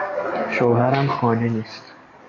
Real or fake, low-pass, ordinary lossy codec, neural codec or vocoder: fake; 7.2 kHz; AAC, 32 kbps; codec, 44.1 kHz, 7.8 kbps, DAC